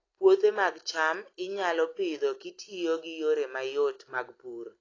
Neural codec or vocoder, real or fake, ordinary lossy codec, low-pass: none; real; AAC, 32 kbps; 7.2 kHz